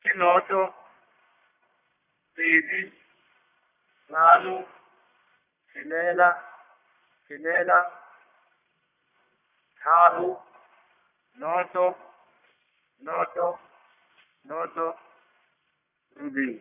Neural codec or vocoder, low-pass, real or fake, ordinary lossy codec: codec, 44.1 kHz, 1.7 kbps, Pupu-Codec; 3.6 kHz; fake; none